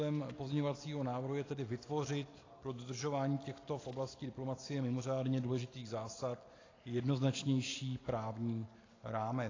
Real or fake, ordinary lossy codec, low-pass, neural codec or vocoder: real; AAC, 32 kbps; 7.2 kHz; none